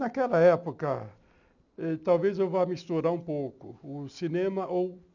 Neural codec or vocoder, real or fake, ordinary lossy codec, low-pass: none; real; none; 7.2 kHz